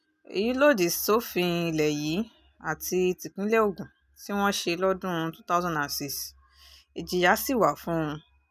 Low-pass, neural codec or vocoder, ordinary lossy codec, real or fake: 14.4 kHz; none; none; real